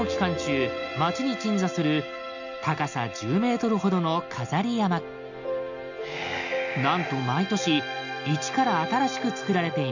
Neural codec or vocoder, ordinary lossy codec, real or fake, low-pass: none; none; real; 7.2 kHz